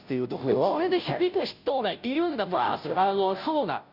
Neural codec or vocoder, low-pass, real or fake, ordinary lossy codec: codec, 16 kHz, 0.5 kbps, FunCodec, trained on Chinese and English, 25 frames a second; 5.4 kHz; fake; none